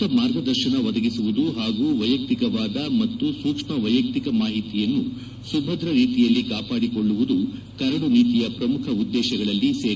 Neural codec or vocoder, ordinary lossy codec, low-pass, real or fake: none; none; none; real